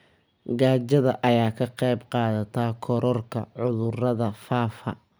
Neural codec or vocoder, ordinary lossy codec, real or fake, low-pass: none; none; real; none